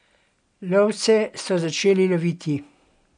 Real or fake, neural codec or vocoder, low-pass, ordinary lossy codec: real; none; 9.9 kHz; none